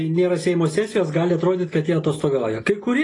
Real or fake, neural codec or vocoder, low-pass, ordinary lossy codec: real; none; 10.8 kHz; AAC, 32 kbps